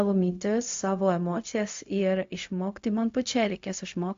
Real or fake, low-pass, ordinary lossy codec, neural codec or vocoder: fake; 7.2 kHz; AAC, 48 kbps; codec, 16 kHz, 0.4 kbps, LongCat-Audio-Codec